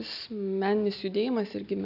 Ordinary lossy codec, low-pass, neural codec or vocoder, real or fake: AAC, 48 kbps; 5.4 kHz; none; real